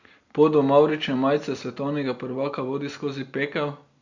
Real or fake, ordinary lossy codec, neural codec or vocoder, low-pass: real; Opus, 64 kbps; none; 7.2 kHz